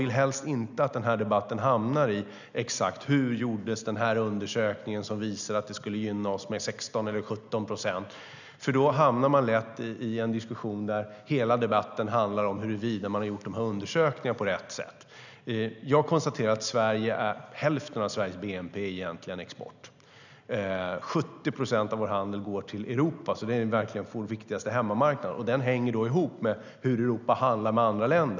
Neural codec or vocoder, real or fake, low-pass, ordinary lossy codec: none; real; 7.2 kHz; none